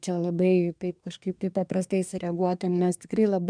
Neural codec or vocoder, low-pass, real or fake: codec, 24 kHz, 1 kbps, SNAC; 9.9 kHz; fake